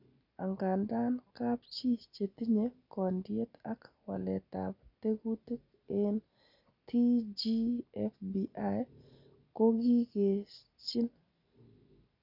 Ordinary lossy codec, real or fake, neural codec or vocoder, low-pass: none; real; none; 5.4 kHz